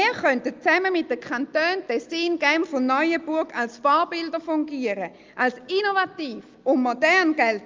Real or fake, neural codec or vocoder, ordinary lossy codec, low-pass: real; none; Opus, 24 kbps; 7.2 kHz